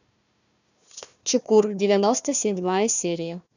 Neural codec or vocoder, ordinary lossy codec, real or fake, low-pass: codec, 16 kHz, 1 kbps, FunCodec, trained on Chinese and English, 50 frames a second; none; fake; 7.2 kHz